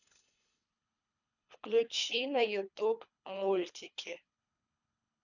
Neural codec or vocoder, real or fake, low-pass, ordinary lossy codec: codec, 24 kHz, 3 kbps, HILCodec; fake; 7.2 kHz; none